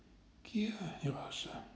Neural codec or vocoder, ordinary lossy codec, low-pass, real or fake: none; none; none; real